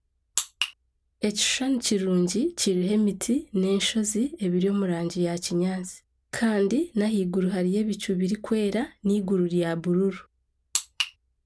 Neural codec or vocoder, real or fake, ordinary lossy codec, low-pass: none; real; none; none